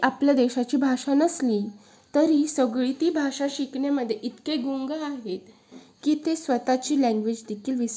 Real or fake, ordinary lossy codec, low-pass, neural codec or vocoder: real; none; none; none